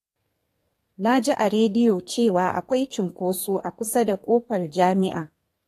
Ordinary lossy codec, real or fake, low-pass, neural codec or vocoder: AAC, 48 kbps; fake; 14.4 kHz; codec, 32 kHz, 1.9 kbps, SNAC